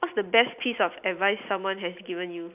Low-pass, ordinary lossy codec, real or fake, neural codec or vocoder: 3.6 kHz; none; real; none